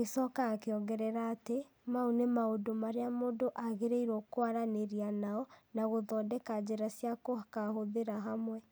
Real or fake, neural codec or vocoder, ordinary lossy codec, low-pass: real; none; none; none